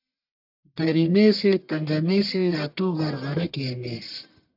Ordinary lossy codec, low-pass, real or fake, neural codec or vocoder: AAC, 48 kbps; 5.4 kHz; fake; codec, 44.1 kHz, 1.7 kbps, Pupu-Codec